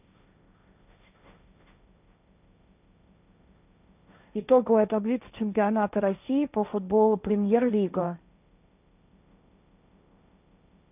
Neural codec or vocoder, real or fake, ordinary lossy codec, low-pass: codec, 16 kHz, 1.1 kbps, Voila-Tokenizer; fake; none; 3.6 kHz